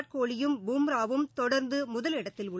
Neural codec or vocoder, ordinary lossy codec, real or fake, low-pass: none; none; real; none